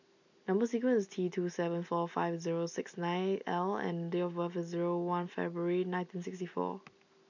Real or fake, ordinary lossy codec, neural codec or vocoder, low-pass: real; none; none; 7.2 kHz